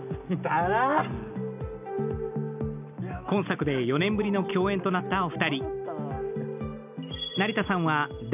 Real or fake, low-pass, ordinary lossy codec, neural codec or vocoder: real; 3.6 kHz; none; none